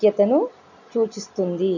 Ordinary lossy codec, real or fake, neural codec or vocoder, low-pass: none; real; none; 7.2 kHz